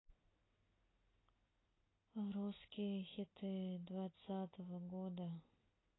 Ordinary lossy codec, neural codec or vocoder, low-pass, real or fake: AAC, 16 kbps; none; 7.2 kHz; real